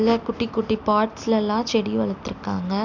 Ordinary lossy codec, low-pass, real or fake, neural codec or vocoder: none; 7.2 kHz; real; none